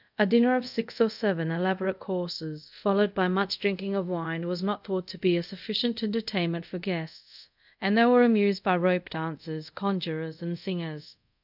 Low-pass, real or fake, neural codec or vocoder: 5.4 kHz; fake; codec, 24 kHz, 0.5 kbps, DualCodec